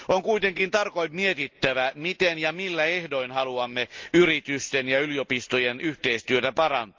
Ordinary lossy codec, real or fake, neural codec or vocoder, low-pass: Opus, 32 kbps; real; none; 7.2 kHz